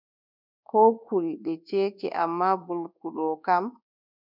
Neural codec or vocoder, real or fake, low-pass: codec, 24 kHz, 1.2 kbps, DualCodec; fake; 5.4 kHz